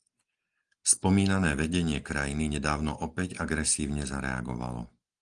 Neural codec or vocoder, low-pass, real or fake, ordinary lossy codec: none; 9.9 kHz; real; Opus, 24 kbps